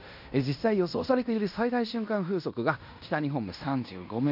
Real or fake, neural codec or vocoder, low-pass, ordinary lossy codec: fake; codec, 16 kHz in and 24 kHz out, 0.9 kbps, LongCat-Audio-Codec, fine tuned four codebook decoder; 5.4 kHz; none